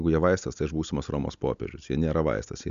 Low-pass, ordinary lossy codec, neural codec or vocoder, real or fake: 7.2 kHz; AAC, 96 kbps; none; real